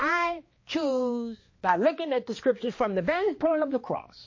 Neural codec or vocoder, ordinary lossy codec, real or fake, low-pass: codec, 16 kHz, 2 kbps, X-Codec, HuBERT features, trained on balanced general audio; MP3, 32 kbps; fake; 7.2 kHz